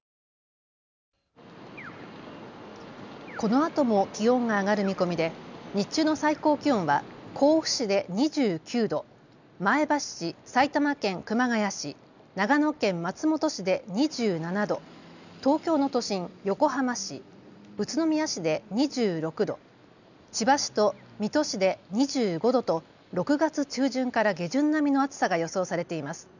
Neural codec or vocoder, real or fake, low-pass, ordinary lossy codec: none; real; 7.2 kHz; none